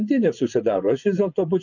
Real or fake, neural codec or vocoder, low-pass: fake; vocoder, 24 kHz, 100 mel bands, Vocos; 7.2 kHz